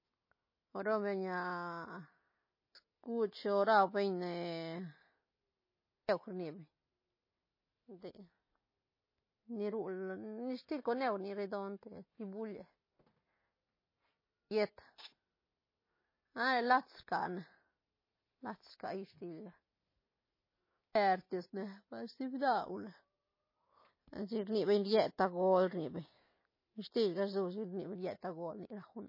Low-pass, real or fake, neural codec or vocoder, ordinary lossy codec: 5.4 kHz; real; none; MP3, 24 kbps